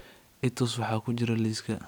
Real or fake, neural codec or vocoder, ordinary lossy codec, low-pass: real; none; none; none